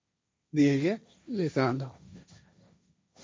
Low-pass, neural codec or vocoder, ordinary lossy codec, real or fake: none; codec, 16 kHz, 1.1 kbps, Voila-Tokenizer; none; fake